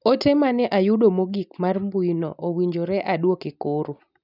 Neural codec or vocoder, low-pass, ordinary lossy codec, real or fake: none; 5.4 kHz; none; real